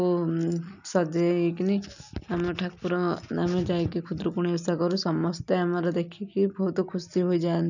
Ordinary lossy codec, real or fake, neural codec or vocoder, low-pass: none; real; none; 7.2 kHz